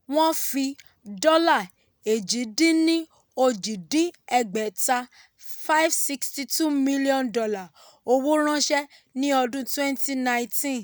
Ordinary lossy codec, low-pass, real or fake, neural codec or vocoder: none; none; real; none